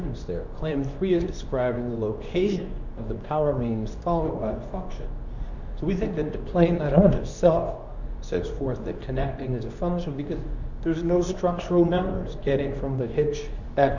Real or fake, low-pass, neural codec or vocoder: fake; 7.2 kHz; codec, 24 kHz, 0.9 kbps, WavTokenizer, medium speech release version 2